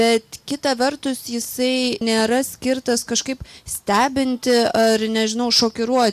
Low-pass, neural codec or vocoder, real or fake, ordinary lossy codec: 14.4 kHz; none; real; AAC, 96 kbps